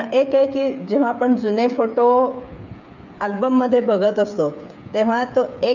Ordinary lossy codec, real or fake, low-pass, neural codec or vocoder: none; fake; 7.2 kHz; codec, 16 kHz, 8 kbps, FreqCodec, smaller model